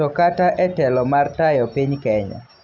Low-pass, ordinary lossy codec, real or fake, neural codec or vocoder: 7.2 kHz; none; real; none